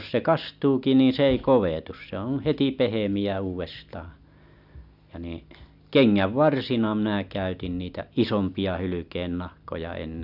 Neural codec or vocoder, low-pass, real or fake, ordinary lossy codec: none; 5.4 kHz; real; none